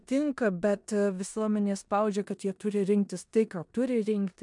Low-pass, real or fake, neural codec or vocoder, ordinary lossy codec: 10.8 kHz; fake; codec, 16 kHz in and 24 kHz out, 0.9 kbps, LongCat-Audio-Codec, four codebook decoder; MP3, 96 kbps